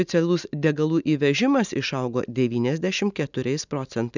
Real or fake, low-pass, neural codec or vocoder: real; 7.2 kHz; none